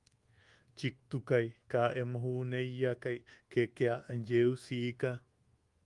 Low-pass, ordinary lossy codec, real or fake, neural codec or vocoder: 10.8 kHz; Opus, 24 kbps; fake; codec, 24 kHz, 1.2 kbps, DualCodec